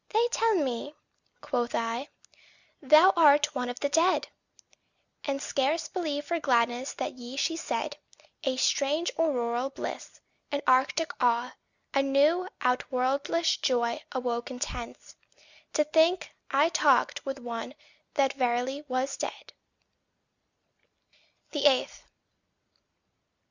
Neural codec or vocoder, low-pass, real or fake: none; 7.2 kHz; real